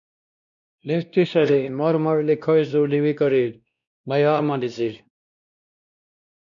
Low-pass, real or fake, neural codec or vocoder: 7.2 kHz; fake; codec, 16 kHz, 1 kbps, X-Codec, WavLM features, trained on Multilingual LibriSpeech